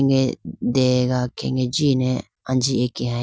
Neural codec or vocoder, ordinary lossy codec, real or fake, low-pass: none; none; real; none